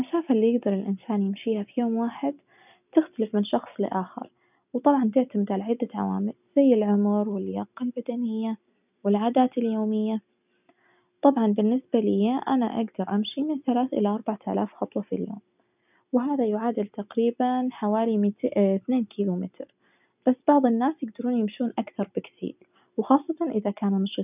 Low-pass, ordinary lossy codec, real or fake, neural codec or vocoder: 3.6 kHz; none; real; none